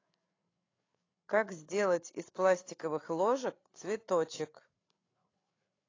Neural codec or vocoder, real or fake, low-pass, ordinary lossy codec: codec, 16 kHz, 8 kbps, FreqCodec, larger model; fake; 7.2 kHz; AAC, 32 kbps